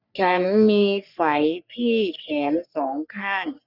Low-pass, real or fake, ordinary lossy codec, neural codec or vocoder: 5.4 kHz; fake; none; codec, 44.1 kHz, 3.4 kbps, Pupu-Codec